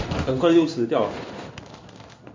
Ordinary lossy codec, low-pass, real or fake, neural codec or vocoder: MP3, 48 kbps; 7.2 kHz; fake; codec, 16 kHz in and 24 kHz out, 1 kbps, XY-Tokenizer